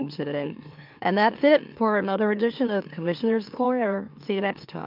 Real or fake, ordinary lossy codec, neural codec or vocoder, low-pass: fake; MP3, 48 kbps; autoencoder, 44.1 kHz, a latent of 192 numbers a frame, MeloTTS; 5.4 kHz